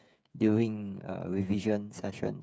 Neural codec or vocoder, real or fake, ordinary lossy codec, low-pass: codec, 16 kHz, 8 kbps, FreqCodec, smaller model; fake; none; none